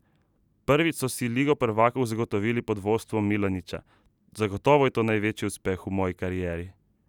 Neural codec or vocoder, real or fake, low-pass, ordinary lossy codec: none; real; 19.8 kHz; none